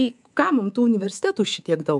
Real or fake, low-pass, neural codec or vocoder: fake; 10.8 kHz; codec, 24 kHz, 3.1 kbps, DualCodec